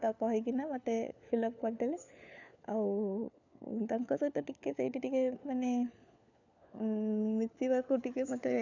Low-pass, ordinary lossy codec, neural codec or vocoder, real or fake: 7.2 kHz; none; codec, 16 kHz, 4 kbps, FunCodec, trained on LibriTTS, 50 frames a second; fake